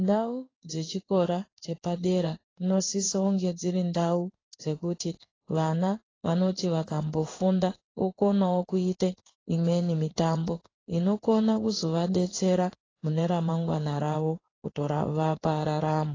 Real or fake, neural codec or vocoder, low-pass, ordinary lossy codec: fake; codec, 16 kHz in and 24 kHz out, 1 kbps, XY-Tokenizer; 7.2 kHz; AAC, 32 kbps